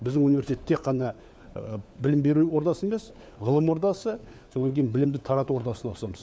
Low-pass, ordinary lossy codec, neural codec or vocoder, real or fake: none; none; codec, 16 kHz, 4 kbps, FunCodec, trained on LibriTTS, 50 frames a second; fake